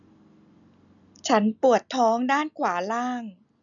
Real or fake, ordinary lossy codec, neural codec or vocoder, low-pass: real; none; none; 7.2 kHz